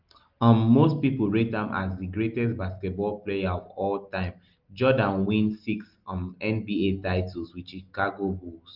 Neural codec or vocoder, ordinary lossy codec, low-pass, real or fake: none; Opus, 32 kbps; 5.4 kHz; real